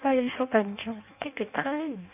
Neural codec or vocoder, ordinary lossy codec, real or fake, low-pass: codec, 16 kHz in and 24 kHz out, 0.6 kbps, FireRedTTS-2 codec; none; fake; 3.6 kHz